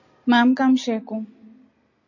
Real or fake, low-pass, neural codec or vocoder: real; 7.2 kHz; none